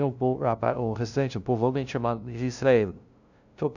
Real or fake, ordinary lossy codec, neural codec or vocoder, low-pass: fake; none; codec, 16 kHz, 0.5 kbps, FunCodec, trained on LibriTTS, 25 frames a second; 7.2 kHz